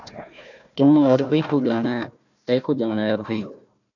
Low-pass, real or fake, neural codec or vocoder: 7.2 kHz; fake; codec, 16 kHz, 1 kbps, FunCodec, trained on Chinese and English, 50 frames a second